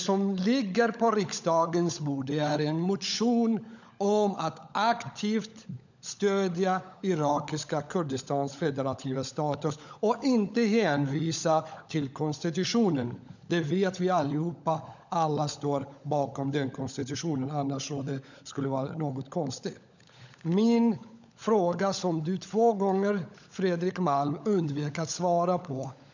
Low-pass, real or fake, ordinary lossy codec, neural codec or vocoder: 7.2 kHz; fake; none; codec, 16 kHz, 16 kbps, FunCodec, trained on LibriTTS, 50 frames a second